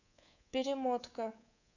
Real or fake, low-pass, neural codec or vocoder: fake; 7.2 kHz; codec, 24 kHz, 3.1 kbps, DualCodec